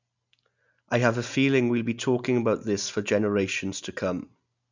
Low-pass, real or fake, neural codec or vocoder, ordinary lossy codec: 7.2 kHz; real; none; none